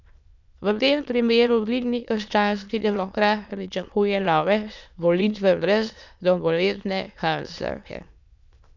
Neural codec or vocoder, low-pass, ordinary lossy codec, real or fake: autoencoder, 22.05 kHz, a latent of 192 numbers a frame, VITS, trained on many speakers; 7.2 kHz; none; fake